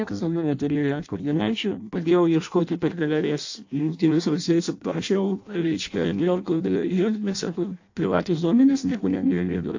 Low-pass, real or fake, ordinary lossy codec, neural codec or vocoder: 7.2 kHz; fake; AAC, 48 kbps; codec, 16 kHz in and 24 kHz out, 0.6 kbps, FireRedTTS-2 codec